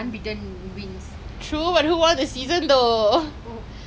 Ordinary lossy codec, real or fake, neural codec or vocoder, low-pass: none; real; none; none